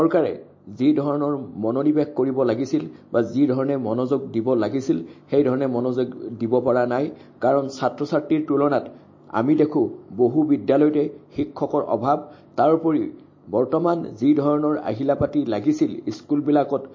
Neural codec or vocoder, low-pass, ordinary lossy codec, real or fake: none; 7.2 kHz; MP3, 32 kbps; real